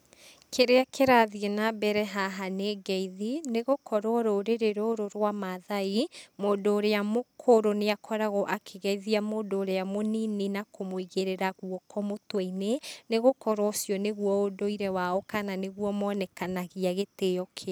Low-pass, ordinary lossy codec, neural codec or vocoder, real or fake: none; none; none; real